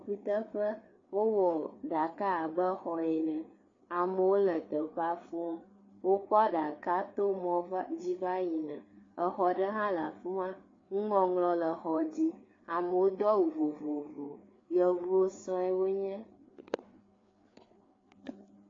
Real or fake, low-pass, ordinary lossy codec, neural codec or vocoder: fake; 7.2 kHz; AAC, 32 kbps; codec, 16 kHz, 4 kbps, FreqCodec, larger model